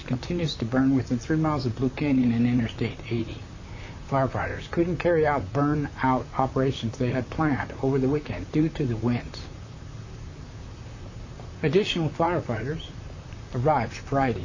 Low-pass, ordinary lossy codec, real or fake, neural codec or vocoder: 7.2 kHz; AAC, 48 kbps; fake; vocoder, 44.1 kHz, 128 mel bands, Pupu-Vocoder